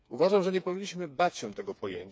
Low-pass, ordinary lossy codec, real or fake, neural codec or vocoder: none; none; fake; codec, 16 kHz, 4 kbps, FreqCodec, smaller model